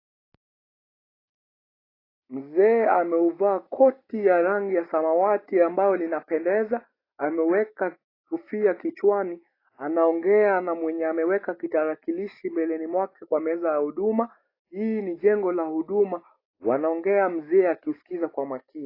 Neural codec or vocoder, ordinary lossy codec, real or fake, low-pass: none; AAC, 24 kbps; real; 5.4 kHz